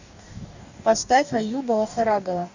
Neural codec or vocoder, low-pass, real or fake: codec, 44.1 kHz, 2.6 kbps, DAC; 7.2 kHz; fake